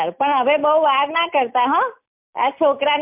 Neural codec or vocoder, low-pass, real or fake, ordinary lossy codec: none; 3.6 kHz; real; MP3, 32 kbps